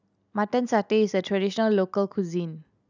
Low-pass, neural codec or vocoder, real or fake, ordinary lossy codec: 7.2 kHz; none; real; none